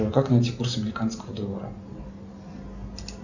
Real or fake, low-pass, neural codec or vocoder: real; 7.2 kHz; none